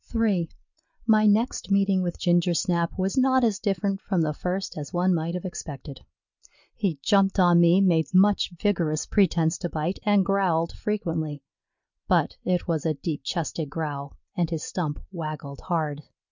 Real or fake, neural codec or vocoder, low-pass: real; none; 7.2 kHz